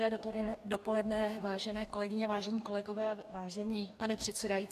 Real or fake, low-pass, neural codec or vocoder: fake; 14.4 kHz; codec, 44.1 kHz, 2.6 kbps, DAC